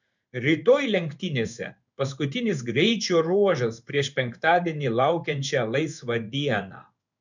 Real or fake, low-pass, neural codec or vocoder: fake; 7.2 kHz; codec, 16 kHz in and 24 kHz out, 1 kbps, XY-Tokenizer